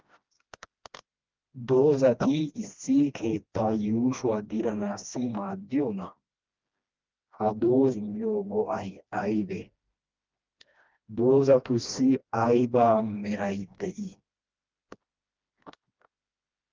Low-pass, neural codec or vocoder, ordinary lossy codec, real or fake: 7.2 kHz; codec, 16 kHz, 1 kbps, FreqCodec, smaller model; Opus, 24 kbps; fake